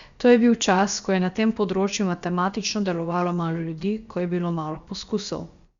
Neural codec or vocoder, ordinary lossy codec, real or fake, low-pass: codec, 16 kHz, about 1 kbps, DyCAST, with the encoder's durations; Opus, 64 kbps; fake; 7.2 kHz